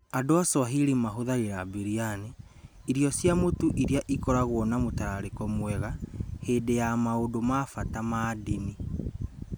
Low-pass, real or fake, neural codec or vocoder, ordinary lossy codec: none; real; none; none